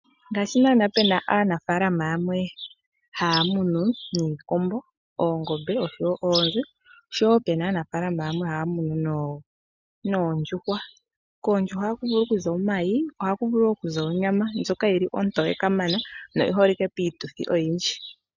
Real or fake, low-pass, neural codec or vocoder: real; 7.2 kHz; none